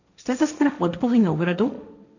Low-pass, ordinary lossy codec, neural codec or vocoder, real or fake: 7.2 kHz; none; codec, 16 kHz, 1.1 kbps, Voila-Tokenizer; fake